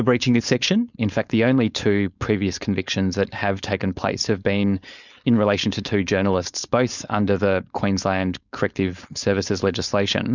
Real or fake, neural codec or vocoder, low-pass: fake; codec, 16 kHz, 4.8 kbps, FACodec; 7.2 kHz